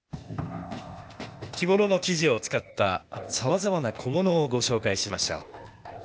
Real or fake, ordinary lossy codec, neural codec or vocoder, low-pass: fake; none; codec, 16 kHz, 0.8 kbps, ZipCodec; none